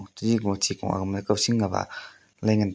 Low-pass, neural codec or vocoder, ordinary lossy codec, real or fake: none; none; none; real